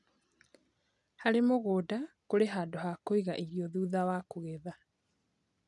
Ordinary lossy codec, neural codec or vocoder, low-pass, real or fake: none; none; 10.8 kHz; real